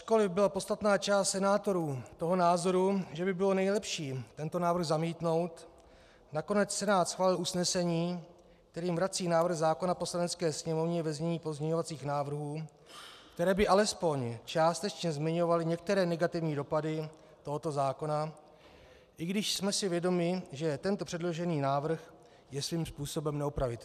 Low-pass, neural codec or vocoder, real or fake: 14.4 kHz; none; real